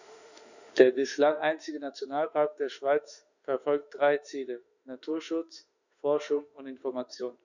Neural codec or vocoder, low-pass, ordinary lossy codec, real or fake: autoencoder, 48 kHz, 32 numbers a frame, DAC-VAE, trained on Japanese speech; 7.2 kHz; none; fake